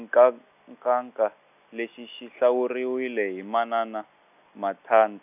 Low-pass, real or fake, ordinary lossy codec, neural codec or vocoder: 3.6 kHz; real; none; none